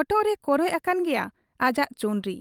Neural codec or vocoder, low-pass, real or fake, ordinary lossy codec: vocoder, 44.1 kHz, 128 mel bands every 512 samples, BigVGAN v2; 19.8 kHz; fake; Opus, 32 kbps